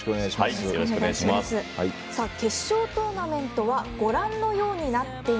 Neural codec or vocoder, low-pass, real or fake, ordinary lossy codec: none; none; real; none